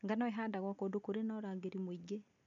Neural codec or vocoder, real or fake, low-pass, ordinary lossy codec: none; real; 7.2 kHz; none